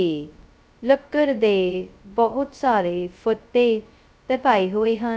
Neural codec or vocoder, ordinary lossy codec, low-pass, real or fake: codec, 16 kHz, 0.2 kbps, FocalCodec; none; none; fake